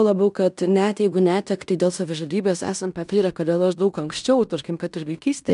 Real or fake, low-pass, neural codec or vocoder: fake; 10.8 kHz; codec, 16 kHz in and 24 kHz out, 0.9 kbps, LongCat-Audio-Codec, fine tuned four codebook decoder